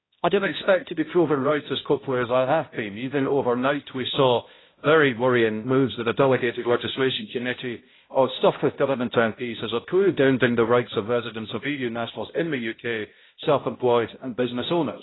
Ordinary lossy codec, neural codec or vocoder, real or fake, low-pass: AAC, 16 kbps; codec, 16 kHz, 0.5 kbps, X-Codec, HuBERT features, trained on balanced general audio; fake; 7.2 kHz